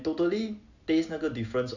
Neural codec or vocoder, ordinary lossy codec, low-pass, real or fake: none; none; 7.2 kHz; real